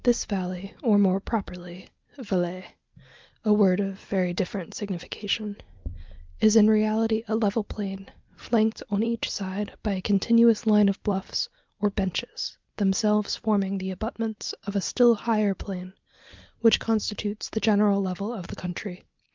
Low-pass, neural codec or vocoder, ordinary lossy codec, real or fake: 7.2 kHz; none; Opus, 32 kbps; real